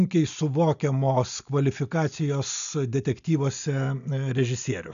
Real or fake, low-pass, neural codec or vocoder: real; 7.2 kHz; none